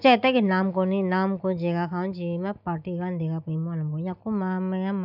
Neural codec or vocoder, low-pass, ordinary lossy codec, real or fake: none; 5.4 kHz; none; real